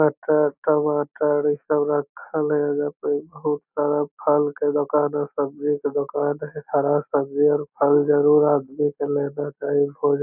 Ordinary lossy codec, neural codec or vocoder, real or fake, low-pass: none; none; real; 3.6 kHz